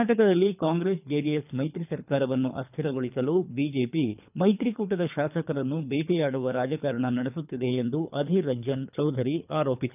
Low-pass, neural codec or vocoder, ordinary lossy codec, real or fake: 3.6 kHz; codec, 24 kHz, 3 kbps, HILCodec; none; fake